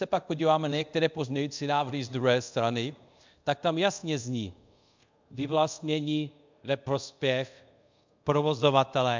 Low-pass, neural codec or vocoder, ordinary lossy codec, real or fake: 7.2 kHz; codec, 24 kHz, 0.5 kbps, DualCodec; MP3, 64 kbps; fake